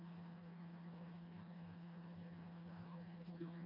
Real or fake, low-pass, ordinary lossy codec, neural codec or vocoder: fake; 5.4 kHz; MP3, 32 kbps; codec, 16 kHz, 2 kbps, FreqCodec, smaller model